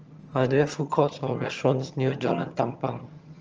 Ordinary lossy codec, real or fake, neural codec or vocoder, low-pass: Opus, 24 kbps; fake; vocoder, 22.05 kHz, 80 mel bands, HiFi-GAN; 7.2 kHz